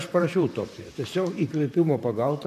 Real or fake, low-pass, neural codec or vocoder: fake; 14.4 kHz; vocoder, 44.1 kHz, 128 mel bands, Pupu-Vocoder